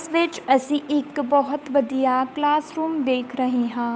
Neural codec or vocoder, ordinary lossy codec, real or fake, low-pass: codec, 16 kHz, 8 kbps, FunCodec, trained on Chinese and English, 25 frames a second; none; fake; none